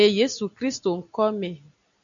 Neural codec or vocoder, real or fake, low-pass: none; real; 7.2 kHz